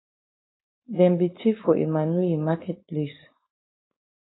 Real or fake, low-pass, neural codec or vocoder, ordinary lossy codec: fake; 7.2 kHz; codec, 16 kHz, 4.8 kbps, FACodec; AAC, 16 kbps